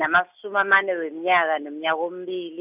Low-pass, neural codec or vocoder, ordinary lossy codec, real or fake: 3.6 kHz; none; none; real